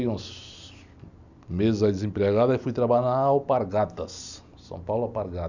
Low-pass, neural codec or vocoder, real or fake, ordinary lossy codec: 7.2 kHz; none; real; none